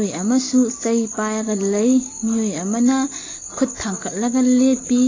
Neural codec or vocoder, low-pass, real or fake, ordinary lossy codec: none; 7.2 kHz; real; AAC, 32 kbps